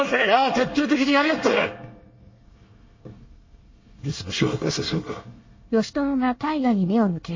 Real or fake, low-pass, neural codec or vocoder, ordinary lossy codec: fake; 7.2 kHz; codec, 24 kHz, 1 kbps, SNAC; MP3, 32 kbps